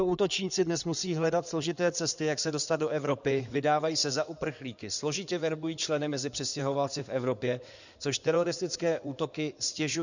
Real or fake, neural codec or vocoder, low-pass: fake; codec, 16 kHz in and 24 kHz out, 2.2 kbps, FireRedTTS-2 codec; 7.2 kHz